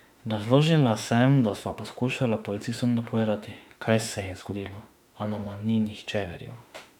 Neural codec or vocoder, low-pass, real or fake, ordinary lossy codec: autoencoder, 48 kHz, 32 numbers a frame, DAC-VAE, trained on Japanese speech; 19.8 kHz; fake; none